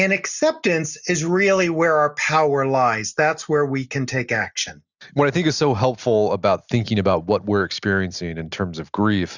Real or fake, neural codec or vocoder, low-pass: real; none; 7.2 kHz